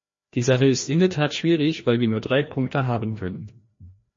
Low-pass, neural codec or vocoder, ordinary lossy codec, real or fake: 7.2 kHz; codec, 16 kHz, 1 kbps, FreqCodec, larger model; MP3, 32 kbps; fake